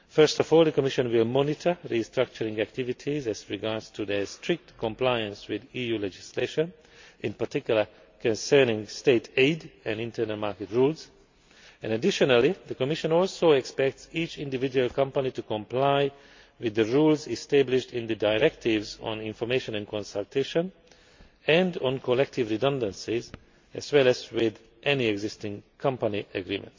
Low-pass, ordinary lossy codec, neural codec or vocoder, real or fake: 7.2 kHz; MP3, 48 kbps; none; real